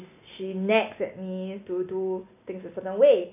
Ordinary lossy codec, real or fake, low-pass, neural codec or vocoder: none; real; 3.6 kHz; none